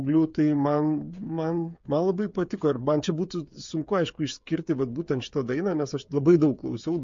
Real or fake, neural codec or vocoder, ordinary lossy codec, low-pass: fake; codec, 16 kHz, 8 kbps, FreqCodec, smaller model; MP3, 48 kbps; 7.2 kHz